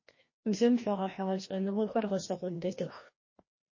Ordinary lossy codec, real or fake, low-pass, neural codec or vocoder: MP3, 32 kbps; fake; 7.2 kHz; codec, 16 kHz, 1 kbps, FreqCodec, larger model